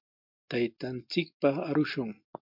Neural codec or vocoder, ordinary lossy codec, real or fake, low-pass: none; MP3, 48 kbps; real; 5.4 kHz